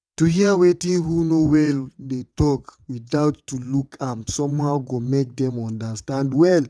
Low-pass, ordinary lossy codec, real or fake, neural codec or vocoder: none; none; fake; vocoder, 22.05 kHz, 80 mel bands, WaveNeXt